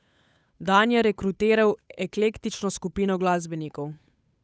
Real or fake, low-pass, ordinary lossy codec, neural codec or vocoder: fake; none; none; codec, 16 kHz, 8 kbps, FunCodec, trained on Chinese and English, 25 frames a second